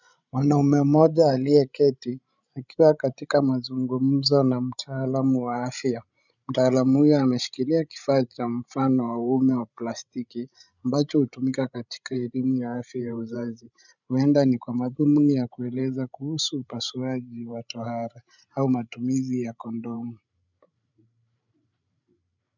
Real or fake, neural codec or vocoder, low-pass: fake; codec, 16 kHz, 16 kbps, FreqCodec, larger model; 7.2 kHz